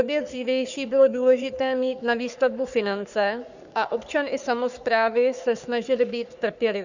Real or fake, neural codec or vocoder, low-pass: fake; codec, 44.1 kHz, 3.4 kbps, Pupu-Codec; 7.2 kHz